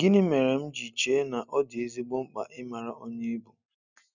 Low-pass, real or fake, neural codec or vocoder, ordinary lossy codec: 7.2 kHz; real; none; none